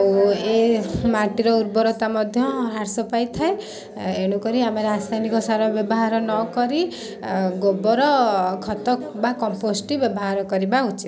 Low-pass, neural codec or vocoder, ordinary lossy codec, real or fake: none; none; none; real